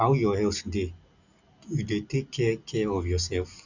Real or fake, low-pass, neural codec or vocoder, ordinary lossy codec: real; 7.2 kHz; none; none